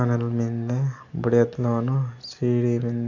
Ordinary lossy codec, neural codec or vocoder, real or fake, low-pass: none; none; real; 7.2 kHz